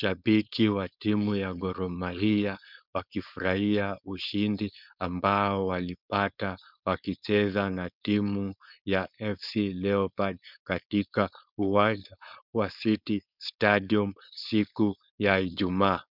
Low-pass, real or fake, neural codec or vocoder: 5.4 kHz; fake; codec, 16 kHz, 4.8 kbps, FACodec